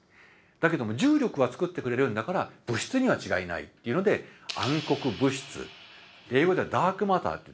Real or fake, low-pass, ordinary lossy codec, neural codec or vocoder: real; none; none; none